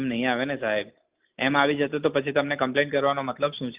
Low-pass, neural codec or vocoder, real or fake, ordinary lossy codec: 3.6 kHz; none; real; Opus, 32 kbps